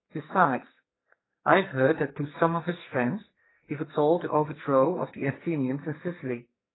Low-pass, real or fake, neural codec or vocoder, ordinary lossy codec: 7.2 kHz; fake; codec, 44.1 kHz, 2.6 kbps, SNAC; AAC, 16 kbps